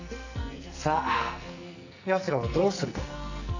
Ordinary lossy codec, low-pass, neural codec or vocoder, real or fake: none; 7.2 kHz; codec, 44.1 kHz, 2.6 kbps, SNAC; fake